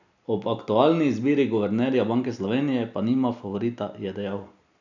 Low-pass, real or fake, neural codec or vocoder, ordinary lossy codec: 7.2 kHz; real; none; none